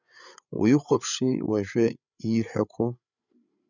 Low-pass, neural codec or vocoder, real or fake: 7.2 kHz; codec, 16 kHz, 8 kbps, FreqCodec, larger model; fake